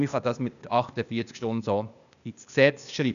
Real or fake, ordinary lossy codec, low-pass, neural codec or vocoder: fake; none; 7.2 kHz; codec, 16 kHz, 0.8 kbps, ZipCodec